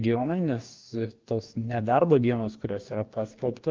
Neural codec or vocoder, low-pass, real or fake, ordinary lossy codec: codec, 44.1 kHz, 2.6 kbps, DAC; 7.2 kHz; fake; Opus, 24 kbps